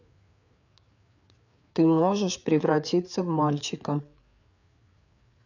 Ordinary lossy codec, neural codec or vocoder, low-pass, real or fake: none; codec, 16 kHz, 4 kbps, FreqCodec, larger model; 7.2 kHz; fake